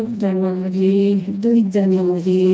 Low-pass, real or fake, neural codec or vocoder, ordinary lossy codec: none; fake; codec, 16 kHz, 1 kbps, FreqCodec, smaller model; none